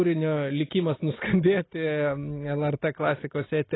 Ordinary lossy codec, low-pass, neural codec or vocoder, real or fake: AAC, 16 kbps; 7.2 kHz; none; real